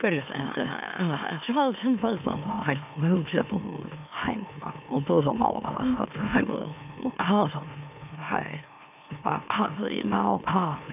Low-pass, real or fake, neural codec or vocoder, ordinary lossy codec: 3.6 kHz; fake; autoencoder, 44.1 kHz, a latent of 192 numbers a frame, MeloTTS; none